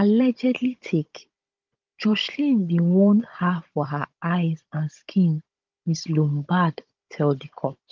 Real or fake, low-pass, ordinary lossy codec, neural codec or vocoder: fake; 7.2 kHz; Opus, 32 kbps; codec, 16 kHz, 16 kbps, FunCodec, trained on Chinese and English, 50 frames a second